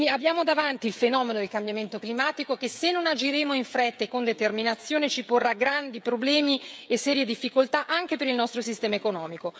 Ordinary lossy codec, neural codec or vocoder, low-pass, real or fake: none; codec, 16 kHz, 16 kbps, FreqCodec, smaller model; none; fake